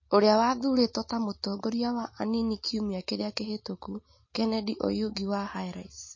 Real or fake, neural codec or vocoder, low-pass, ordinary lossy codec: real; none; 7.2 kHz; MP3, 32 kbps